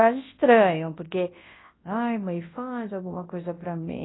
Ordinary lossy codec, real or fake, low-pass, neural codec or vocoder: AAC, 16 kbps; fake; 7.2 kHz; codec, 24 kHz, 0.9 kbps, WavTokenizer, large speech release